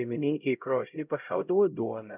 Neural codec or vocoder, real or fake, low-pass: codec, 16 kHz, 0.5 kbps, X-Codec, HuBERT features, trained on LibriSpeech; fake; 3.6 kHz